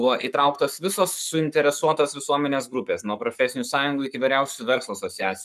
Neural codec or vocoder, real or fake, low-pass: codec, 44.1 kHz, 7.8 kbps, Pupu-Codec; fake; 14.4 kHz